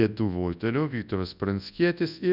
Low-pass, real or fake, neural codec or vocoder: 5.4 kHz; fake; codec, 24 kHz, 0.9 kbps, WavTokenizer, large speech release